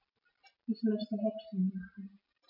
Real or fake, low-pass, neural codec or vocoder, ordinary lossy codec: real; 5.4 kHz; none; none